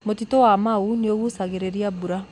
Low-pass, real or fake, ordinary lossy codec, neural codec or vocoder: 10.8 kHz; real; AAC, 64 kbps; none